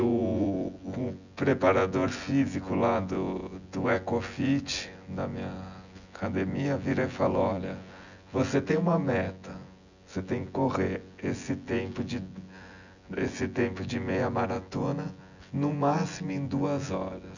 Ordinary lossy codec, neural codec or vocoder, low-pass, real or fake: none; vocoder, 24 kHz, 100 mel bands, Vocos; 7.2 kHz; fake